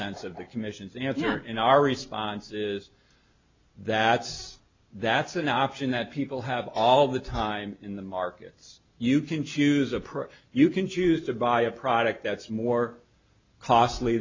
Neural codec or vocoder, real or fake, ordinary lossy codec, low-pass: none; real; MP3, 64 kbps; 7.2 kHz